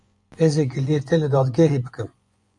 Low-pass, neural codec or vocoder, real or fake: 10.8 kHz; vocoder, 24 kHz, 100 mel bands, Vocos; fake